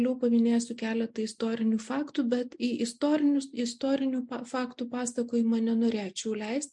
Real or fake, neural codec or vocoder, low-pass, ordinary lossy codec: real; none; 10.8 kHz; MP3, 64 kbps